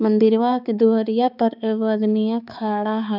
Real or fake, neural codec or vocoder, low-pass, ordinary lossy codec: fake; codec, 16 kHz, 4 kbps, X-Codec, HuBERT features, trained on balanced general audio; 5.4 kHz; none